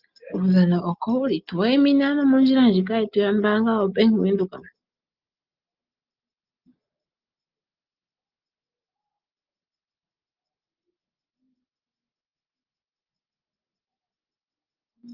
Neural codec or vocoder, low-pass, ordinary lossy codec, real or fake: none; 5.4 kHz; Opus, 32 kbps; real